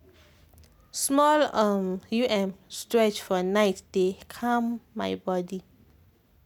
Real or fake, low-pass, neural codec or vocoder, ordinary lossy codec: real; 19.8 kHz; none; none